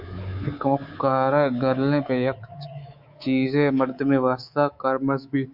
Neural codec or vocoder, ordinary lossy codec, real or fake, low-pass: codec, 24 kHz, 3.1 kbps, DualCodec; MP3, 48 kbps; fake; 5.4 kHz